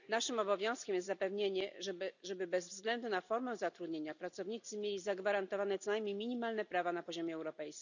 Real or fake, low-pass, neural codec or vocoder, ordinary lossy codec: real; 7.2 kHz; none; none